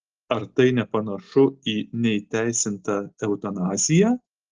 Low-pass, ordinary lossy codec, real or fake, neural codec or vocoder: 7.2 kHz; Opus, 16 kbps; real; none